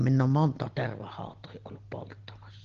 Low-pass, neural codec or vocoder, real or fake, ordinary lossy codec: 7.2 kHz; none; real; Opus, 16 kbps